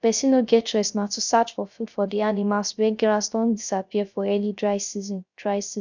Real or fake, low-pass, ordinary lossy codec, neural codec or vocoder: fake; 7.2 kHz; none; codec, 16 kHz, 0.3 kbps, FocalCodec